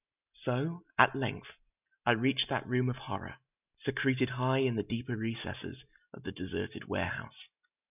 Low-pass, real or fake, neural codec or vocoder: 3.6 kHz; real; none